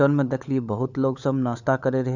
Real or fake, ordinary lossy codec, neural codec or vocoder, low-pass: fake; none; codec, 16 kHz, 16 kbps, FunCodec, trained on LibriTTS, 50 frames a second; 7.2 kHz